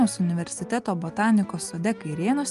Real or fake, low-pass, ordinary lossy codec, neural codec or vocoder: real; 10.8 kHz; Opus, 32 kbps; none